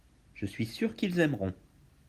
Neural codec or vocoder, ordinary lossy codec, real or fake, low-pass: none; Opus, 24 kbps; real; 14.4 kHz